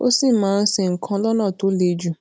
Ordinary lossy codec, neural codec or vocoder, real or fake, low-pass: none; none; real; none